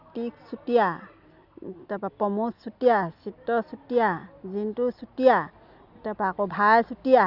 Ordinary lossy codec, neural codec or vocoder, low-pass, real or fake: Opus, 64 kbps; none; 5.4 kHz; real